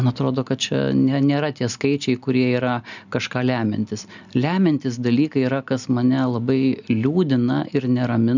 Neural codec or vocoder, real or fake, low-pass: none; real; 7.2 kHz